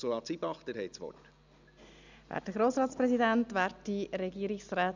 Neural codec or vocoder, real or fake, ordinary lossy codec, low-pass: none; real; none; 7.2 kHz